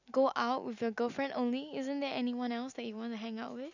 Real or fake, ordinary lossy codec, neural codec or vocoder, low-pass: real; none; none; 7.2 kHz